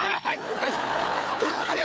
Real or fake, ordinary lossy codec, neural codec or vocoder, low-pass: fake; none; codec, 16 kHz, 4 kbps, FreqCodec, larger model; none